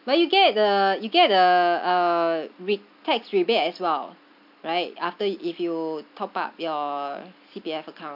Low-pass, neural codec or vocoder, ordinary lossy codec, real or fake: 5.4 kHz; none; AAC, 48 kbps; real